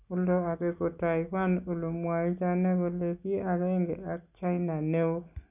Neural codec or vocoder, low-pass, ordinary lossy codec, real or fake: none; 3.6 kHz; none; real